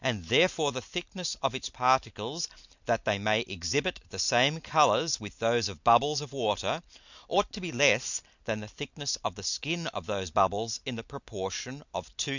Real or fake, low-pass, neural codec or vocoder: real; 7.2 kHz; none